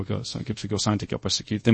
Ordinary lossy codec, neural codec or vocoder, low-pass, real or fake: MP3, 32 kbps; codec, 24 kHz, 0.5 kbps, DualCodec; 9.9 kHz; fake